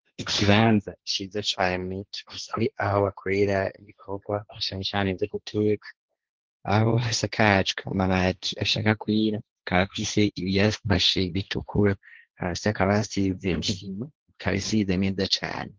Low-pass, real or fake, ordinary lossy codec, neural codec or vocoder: 7.2 kHz; fake; Opus, 16 kbps; codec, 16 kHz, 1.1 kbps, Voila-Tokenizer